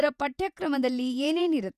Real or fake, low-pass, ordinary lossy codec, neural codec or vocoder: fake; 14.4 kHz; none; vocoder, 48 kHz, 128 mel bands, Vocos